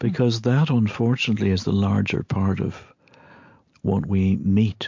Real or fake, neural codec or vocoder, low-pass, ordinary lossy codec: real; none; 7.2 kHz; MP3, 48 kbps